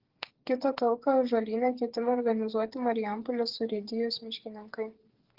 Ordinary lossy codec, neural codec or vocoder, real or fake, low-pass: Opus, 16 kbps; codec, 16 kHz, 8 kbps, FreqCodec, smaller model; fake; 5.4 kHz